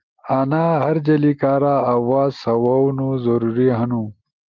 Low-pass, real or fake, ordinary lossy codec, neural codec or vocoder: 7.2 kHz; real; Opus, 32 kbps; none